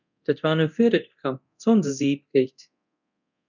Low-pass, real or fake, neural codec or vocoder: 7.2 kHz; fake; codec, 24 kHz, 0.9 kbps, DualCodec